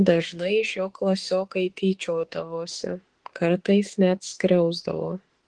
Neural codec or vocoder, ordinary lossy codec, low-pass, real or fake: autoencoder, 48 kHz, 32 numbers a frame, DAC-VAE, trained on Japanese speech; Opus, 16 kbps; 10.8 kHz; fake